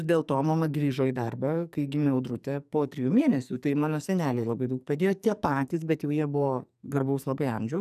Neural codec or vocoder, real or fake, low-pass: codec, 44.1 kHz, 2.6 kbps, SNAC; fake; 14.4 kHz